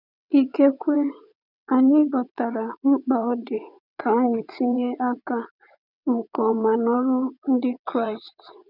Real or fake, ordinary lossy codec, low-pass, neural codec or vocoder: fake; none; 5.4 kHz; vocoder, 22.05 kHz, 80 mel bands, WaveNeXt